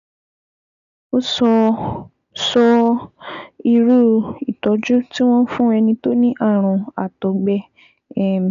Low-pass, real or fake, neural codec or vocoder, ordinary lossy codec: 7.2 kHz; real; none; none